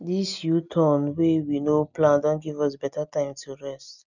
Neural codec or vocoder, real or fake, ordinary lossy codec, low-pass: none; real; none; 7.2 kHz